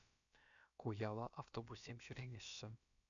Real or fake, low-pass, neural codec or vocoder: fake; 7.2 kHz; codec, 16 kHz, about 1 kbps, DyCAST, with the encoder's durations